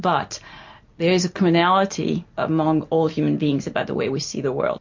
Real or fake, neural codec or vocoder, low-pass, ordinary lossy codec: real; none; 7.2 kHz; MP3, 48 kbps